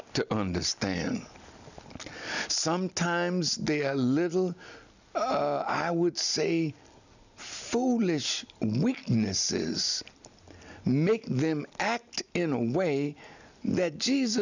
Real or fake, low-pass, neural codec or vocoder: real; 7.2 kHz; none